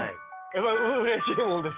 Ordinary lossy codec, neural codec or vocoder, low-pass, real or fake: Opus, 32 kbps; codec, 44.1 kHz, 7.8 kbps, DAC; 3.6 kHz; fake